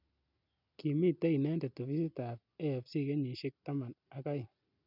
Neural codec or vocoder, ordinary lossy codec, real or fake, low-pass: none; none; real; 5.4 kHz